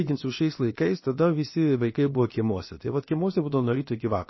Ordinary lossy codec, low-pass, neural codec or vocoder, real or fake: MP3, 24 kbps; 7.2 kHz; codec, 16 kHz, about 1 kbps, DyCAST, with the encoder's durations; fake